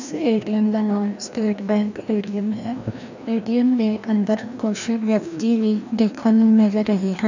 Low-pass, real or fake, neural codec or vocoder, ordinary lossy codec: 7.2 kHz; fake; codec, 16 kHz, 1 kbps, FreqCodec, larger model; none